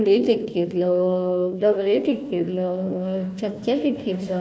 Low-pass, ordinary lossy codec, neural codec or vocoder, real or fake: none; none; codec, 16 kHz, 1 kbps, FunCodec, trained on Chinese and English, 50 frames a second; fake